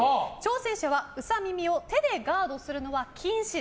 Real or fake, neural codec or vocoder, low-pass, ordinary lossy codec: real; none; none; none